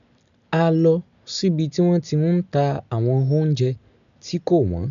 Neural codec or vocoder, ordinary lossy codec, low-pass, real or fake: none; none; 7.2 kHz; real